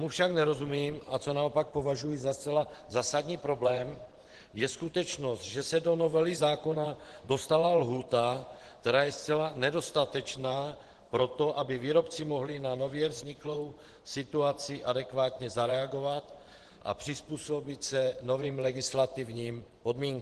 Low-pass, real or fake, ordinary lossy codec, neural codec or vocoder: 10.8 kHz; fake; Opus, 16 kbps; vocoder, 24 kHz, 100 mel bands, Vocos